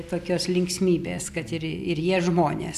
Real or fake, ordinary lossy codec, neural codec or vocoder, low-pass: real; AAC, 96 kbps; none; 14.4 kHz